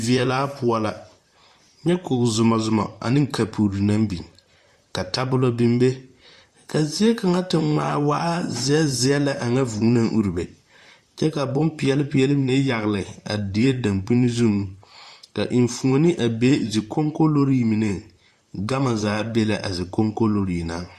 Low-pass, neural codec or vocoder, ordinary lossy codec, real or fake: 14.4 kHz; vocoder, 44.1 kHz, 128 mel bands, Pupu-Vocoder; Opus, 64 kbps; fake